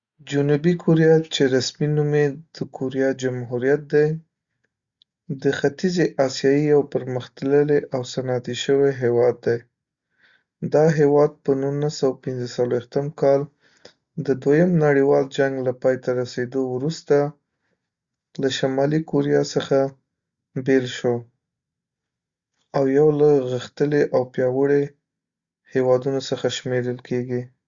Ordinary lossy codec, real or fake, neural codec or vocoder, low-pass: Opus, 64 kbps; real; none; 7.2 kHz